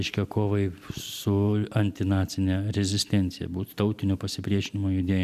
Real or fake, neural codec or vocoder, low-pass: real; none; 14.4 kHz